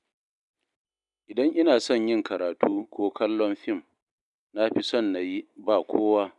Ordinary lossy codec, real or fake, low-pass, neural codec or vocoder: none; real; 10.8 kHz; none